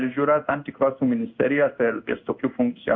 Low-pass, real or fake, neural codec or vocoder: 7.2 kHz; fake; codec, 16 kHz in and 24 kHz out, 1 kbps, XY-Tokenizer